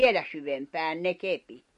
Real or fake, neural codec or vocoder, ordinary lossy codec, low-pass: real; none; MP3, 48 kbps; 10.8 kHz